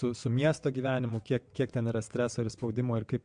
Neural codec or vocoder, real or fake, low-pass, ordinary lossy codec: vocoder, 22.05 kHz, 80 mel bands, WaveNeXt; fake; 9.9 kHz; MP3, 64 kbps